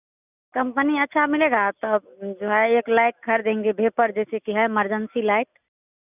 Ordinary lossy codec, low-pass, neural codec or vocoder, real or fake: none; 3.6 kHz; none; real